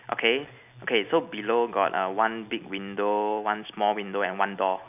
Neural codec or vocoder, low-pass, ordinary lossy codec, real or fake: none; 3.6 kHz; none; real